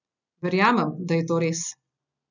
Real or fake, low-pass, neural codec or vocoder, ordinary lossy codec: real; 7.2 kHz; none; none